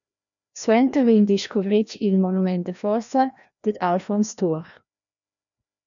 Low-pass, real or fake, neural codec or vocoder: 7.2 kHz; fake; codec, 16 kHz, 1 kbps, FreqCodec, larger model